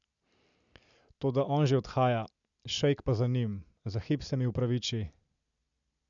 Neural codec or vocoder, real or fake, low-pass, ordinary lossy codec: none; real; 7.2 kHz; MP3, 96 kbps